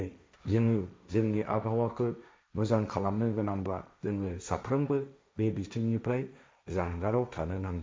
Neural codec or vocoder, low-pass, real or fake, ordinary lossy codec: codec, 16 kHz, 1.1 kbps, Voila-Tokenizer; none; fake; none